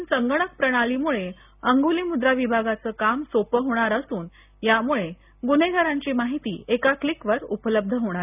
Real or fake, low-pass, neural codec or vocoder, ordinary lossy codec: real; 3.6 kHz; none; none